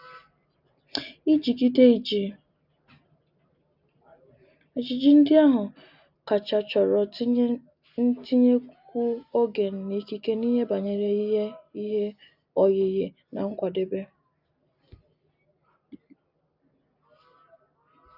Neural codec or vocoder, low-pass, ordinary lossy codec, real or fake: none; 5.4 kHz; none; real